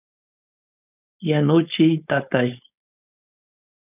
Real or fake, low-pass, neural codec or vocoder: fake; 3.6 kHz; codec, 16 kHz, 4.8 kbps, FACodec